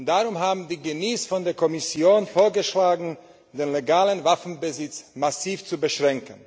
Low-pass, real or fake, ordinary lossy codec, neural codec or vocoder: none; real; none; none